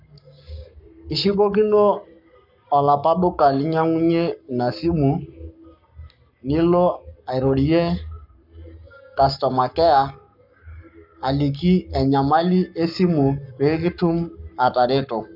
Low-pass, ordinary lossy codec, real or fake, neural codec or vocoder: 5.4 kHz; none; fake; codec, 44.1 kHz, 7.8 kbps, Pupu-Codec